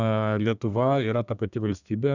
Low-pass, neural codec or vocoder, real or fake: 7.2 kHz; codec, 32 kHz, 1.9 kbps, SNAC; fake